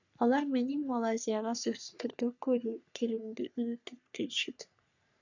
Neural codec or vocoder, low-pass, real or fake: codec, 44.1 kHz, 3.4 kbps, Pupu-Codec; 7.2 kHz; fake